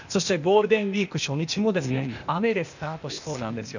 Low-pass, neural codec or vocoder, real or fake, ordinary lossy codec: 7.2 kHz; codec, 16 kHz, 0.8 kbps, ZipCodec; fake; none